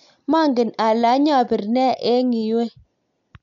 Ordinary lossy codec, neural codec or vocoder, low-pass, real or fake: none; none; 7.2 kHz; real